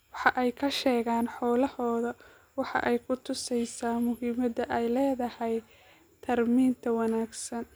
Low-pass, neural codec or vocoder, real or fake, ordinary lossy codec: none; none; real; none